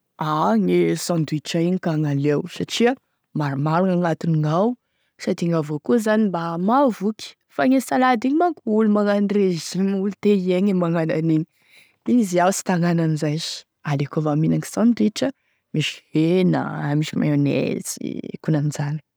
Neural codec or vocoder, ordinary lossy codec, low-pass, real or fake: none; none; none; real